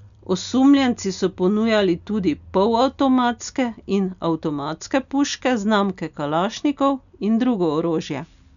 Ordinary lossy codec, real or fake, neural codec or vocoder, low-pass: none; real; none; 7.2 kHz